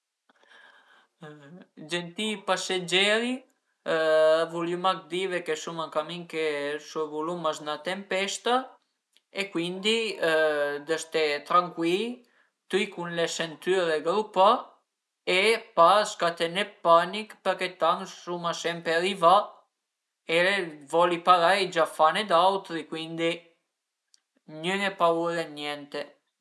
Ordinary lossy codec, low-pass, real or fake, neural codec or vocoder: none; none; real; none